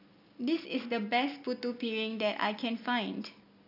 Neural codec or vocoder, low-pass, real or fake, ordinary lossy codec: none; 5.4 kHz; real; none